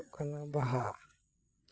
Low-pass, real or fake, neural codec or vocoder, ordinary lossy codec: none; fake; codec, 16 kHz, 8 kbps, FreqCodec, larger model; none